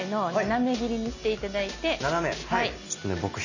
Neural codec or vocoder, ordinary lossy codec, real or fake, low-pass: none; none; real; 7.2 kHz